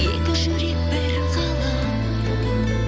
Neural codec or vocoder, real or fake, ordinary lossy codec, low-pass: none; real; none; none